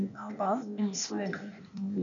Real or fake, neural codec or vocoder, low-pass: fake; codec, 16 kHz, 0.8 kbps, ZipCodec; 7.2 kHz